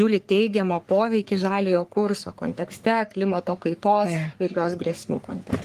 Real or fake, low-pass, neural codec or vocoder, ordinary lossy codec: fake; 14.4 kHz; codec, 44.1 kHz, 3.4 kbps, Pupu-Codec; Opus, 24 kbps